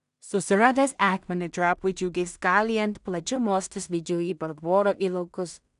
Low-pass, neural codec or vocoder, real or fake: 10.8 kHz; codec, 16 kHz in and 24 kHz out, 0.4 kbps, LongCat-Audio-Codec, two codebook decoder; fake